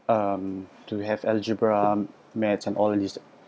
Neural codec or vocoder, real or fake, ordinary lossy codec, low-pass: none; real; none; none